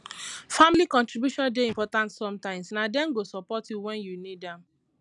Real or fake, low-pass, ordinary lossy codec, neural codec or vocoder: real; 10.8 kHz; none; none